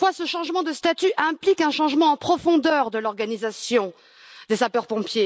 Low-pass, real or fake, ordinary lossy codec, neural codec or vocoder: none; real; none; none